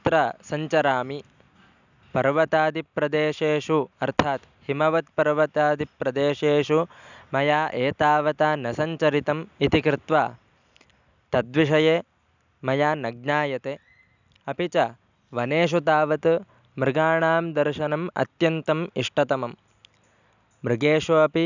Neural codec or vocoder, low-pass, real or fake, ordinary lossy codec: none; 7.2 kHz; real; none